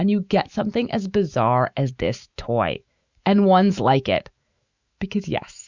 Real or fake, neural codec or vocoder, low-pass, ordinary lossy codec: fake; autoencoder, 48 kHz, 128 numbers a frame, DAC-VAE, trained on Japanese speech; 7.2 kHz; Opus, 64 kbps